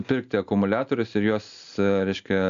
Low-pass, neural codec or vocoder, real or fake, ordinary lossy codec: 7.2 kHz; none; real; AAC, 96 kbps